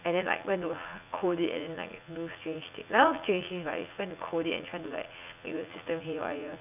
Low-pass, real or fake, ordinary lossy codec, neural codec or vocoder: 3.6 kHz; fake; none; vocoder, 44.1 kHz, 80 mel bands, Vocos